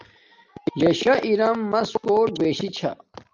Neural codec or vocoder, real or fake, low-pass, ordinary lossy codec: none; real; 7.2 kHz; Opus, 24 kbps